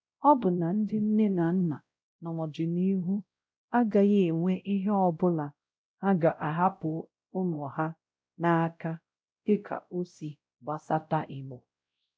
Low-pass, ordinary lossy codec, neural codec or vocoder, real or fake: none; none; codec, 16 kHz, 0.5 kbps, X-Codec, WavLM features, trained on Multilingual LibriSpeech; fake